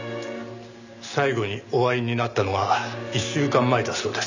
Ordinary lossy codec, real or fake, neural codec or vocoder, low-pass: none; real; none; 7.2 kHz